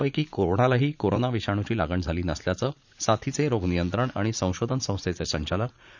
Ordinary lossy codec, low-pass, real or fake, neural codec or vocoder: none; 7.2 kHz; fake; vocoder, 44.1 kHz, 80 mel bands, Vocos